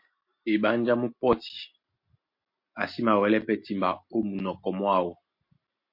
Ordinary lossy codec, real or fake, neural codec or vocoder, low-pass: MP3, 32 kbps; real; none; 5.4 kHz